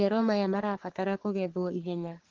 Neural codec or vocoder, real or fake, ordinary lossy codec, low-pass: codec, 44.1 kHz, 3.4 kbps, Pupu-Codec; fake; Opus, 16 kbps; 7.2 kHz